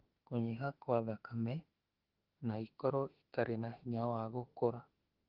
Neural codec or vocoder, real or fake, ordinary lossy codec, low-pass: autoencoder, 48 kHz, 32 numbers a frame, DAC-VAE, trained on Japanese speech; fake; Opus, 16 kbps; 5.4 kHz